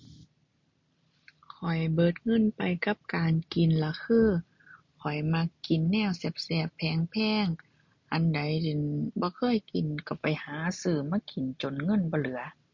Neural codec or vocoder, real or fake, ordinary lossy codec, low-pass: none; real; MP3, 48 kbps; 7.2 kHz